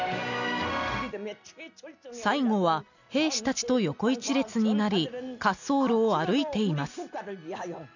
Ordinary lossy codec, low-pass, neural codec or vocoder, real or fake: none; 7.2 kHz; none; real